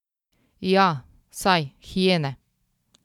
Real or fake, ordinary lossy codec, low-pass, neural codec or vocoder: real; none; 19.8 kHz; none